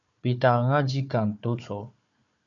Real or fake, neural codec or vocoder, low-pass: fake; codec, 16 kHz, 4 kbps, FunCodec, trained on Chinese and English, 50 frames a second; 7.2 kHz